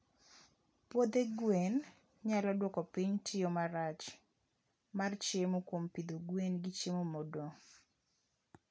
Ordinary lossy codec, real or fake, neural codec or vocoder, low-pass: none; real; none; none